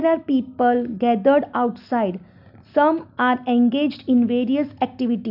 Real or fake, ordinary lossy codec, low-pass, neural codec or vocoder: real; none; 5.4 kHz; none